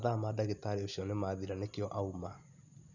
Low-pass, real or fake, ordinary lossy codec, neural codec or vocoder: 7.2 kHz; real; none; none